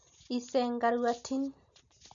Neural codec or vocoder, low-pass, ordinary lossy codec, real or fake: none; 7.2 kHz; none; real